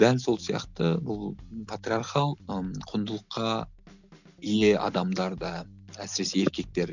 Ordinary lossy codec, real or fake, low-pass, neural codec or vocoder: none; real; 7.2 kHz; none